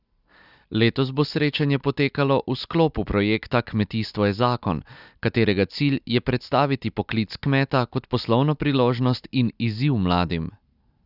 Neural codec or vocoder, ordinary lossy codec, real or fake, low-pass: none; Opus, 64 kbps; real; 5.4 kHz